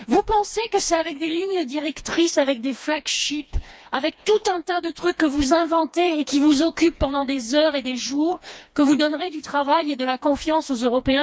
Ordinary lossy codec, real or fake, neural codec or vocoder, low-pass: none; fake; codec, 16 kHz, 2 kbps, FreqCodec, smaller model; none